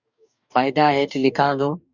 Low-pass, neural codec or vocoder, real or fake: 7.2 kHz; codec, 44.1 kHz, 2.6 kbps, DAC; fake